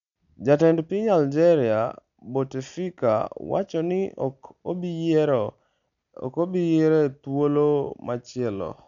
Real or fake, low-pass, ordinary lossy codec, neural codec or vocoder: real; 7.2 kHz; none; none